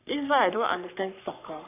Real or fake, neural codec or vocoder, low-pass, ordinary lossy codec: fake; codec, 44.1 kHz, 3.4 kbps, Pupu-Codec; 3.6 kHz; none